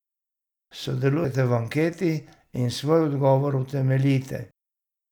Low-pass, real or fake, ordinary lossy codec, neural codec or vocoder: 19.8 kHz; real; none; none